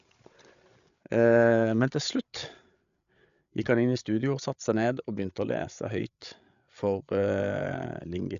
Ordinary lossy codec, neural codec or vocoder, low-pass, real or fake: AAC, 96 kbps; codec, 16 kHz, 8 kbps, FreqCodec, larger model; 7.2 kHz; fake